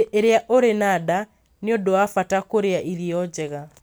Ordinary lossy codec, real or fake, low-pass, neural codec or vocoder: none; real; none; none